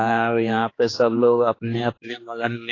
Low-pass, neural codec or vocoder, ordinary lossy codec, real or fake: 7.2 kHz; codec, 16 kHz, 2 kbps, X-Codec, HuBERT features, trained on general audio; AAC, 32 kbps; fake